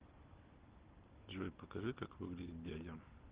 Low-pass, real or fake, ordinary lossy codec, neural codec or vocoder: 3.6 kHz; real; Opus, 16 kbps; none